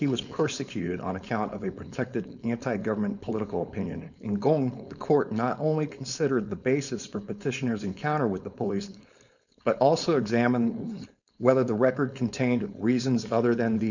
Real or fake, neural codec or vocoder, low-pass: fake; codec, 16 kHz, 4.8 kbps, FACodec; 7.2 kHz